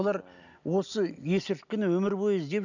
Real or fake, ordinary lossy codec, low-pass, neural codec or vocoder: real; none; 7.2 kHz; none